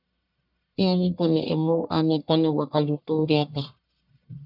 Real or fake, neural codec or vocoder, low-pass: fake; codec, 44.1 kHz, 1.7 kbps, Pupu-Codec; 5.4 kHz